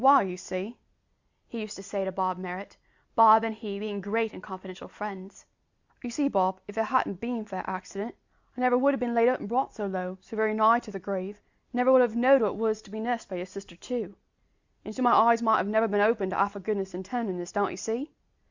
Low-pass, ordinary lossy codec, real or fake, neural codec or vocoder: 7.2 kHz; Opus, 64 kbps; real; none